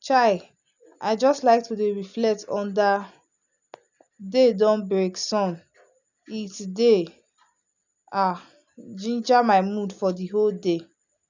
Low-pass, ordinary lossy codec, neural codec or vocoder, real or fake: 7.2 kHz; none; none; real